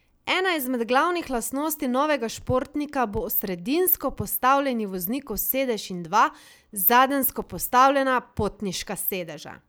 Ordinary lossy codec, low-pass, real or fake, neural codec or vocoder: none; none; real; none